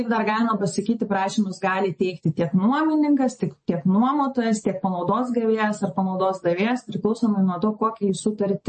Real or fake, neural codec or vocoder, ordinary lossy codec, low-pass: real; none; MP3, 32 kbps; 10.8 kHz